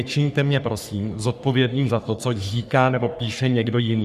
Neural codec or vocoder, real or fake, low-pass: codec, 44.1 kHz, 3.4 kbps, Pupu-Codec; fake; 14.4 kHz